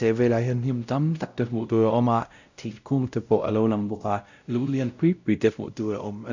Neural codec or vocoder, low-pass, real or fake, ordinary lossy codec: codec, 16 kHz, 0.5 kbps, X-Codec, WavLM features, trained on Multilingual LibriSpeech; 7.2 kHz; fake; none